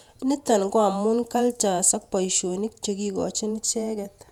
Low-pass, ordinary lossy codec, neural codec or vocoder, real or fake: 19.8 kHz; none; vocoder, 48 kHz, 128 mel bands, Vocos; fake